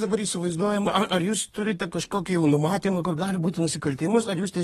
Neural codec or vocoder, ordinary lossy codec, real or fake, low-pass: codec, 32 kHz, 1.9 kbps, SNAC; AAC, 32 kbps; fake; 14.4 kHz